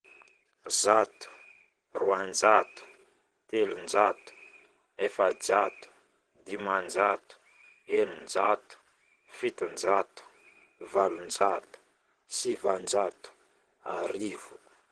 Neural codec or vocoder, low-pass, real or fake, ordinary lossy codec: vocoder, 22.05 kHz, 80 mel bands, WaveNeXt; 9.9 kHz; fake; Opus, 16 kbps